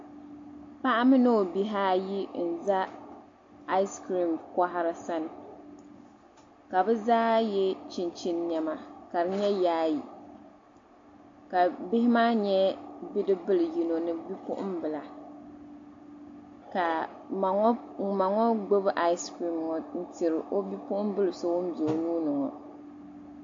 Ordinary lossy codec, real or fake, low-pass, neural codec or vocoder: AAC, 48 kbps; real; 7.2 kHz; none